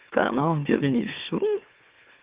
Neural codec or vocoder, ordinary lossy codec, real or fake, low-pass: autoencoder, 44.1 kHz, a latent of 192 numbers a frame, MeloTTS; Opus, 32 kbps; fake; 3.6 kHz